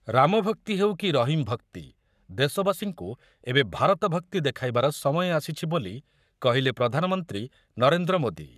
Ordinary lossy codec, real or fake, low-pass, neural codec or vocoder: none; fake; 14.4 kHz; codec, 44.1 kHz, 7.8 kbps, Pupu-Codec